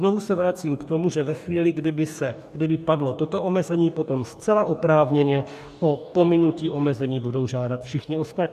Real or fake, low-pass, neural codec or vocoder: fake; 14.4 kHz; codec, 44.1 kHz, 2.6 kbps, DAC